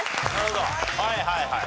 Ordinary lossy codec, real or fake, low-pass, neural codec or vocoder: none; real; none; none